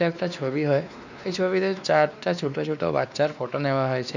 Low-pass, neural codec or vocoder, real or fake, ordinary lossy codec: 7.2 kHz; codec, 16 kHz, 2 kbps, X-Codec, WavLM features, trained on Multilingual LibriSpeech; fake; none